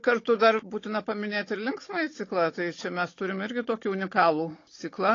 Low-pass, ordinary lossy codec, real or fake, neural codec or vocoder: 7.2 kHz; AAC, 32 kbps; fake; codec, 16 kHz, 16 kbps, FunCodec, trained on Chinese and English, 50 frames a second